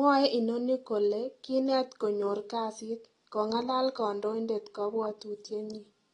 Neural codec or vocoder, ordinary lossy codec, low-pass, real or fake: none; AAC, 32 kbps; 10.8 kHz; real